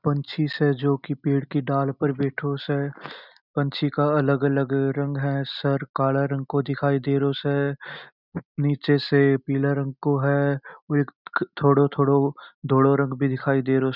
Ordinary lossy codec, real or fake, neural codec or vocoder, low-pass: none; real; none; 5.4 kHz